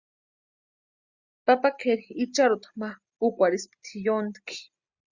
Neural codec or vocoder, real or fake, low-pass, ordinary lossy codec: none; real; 7.2 kHz; Opus, 64 kbps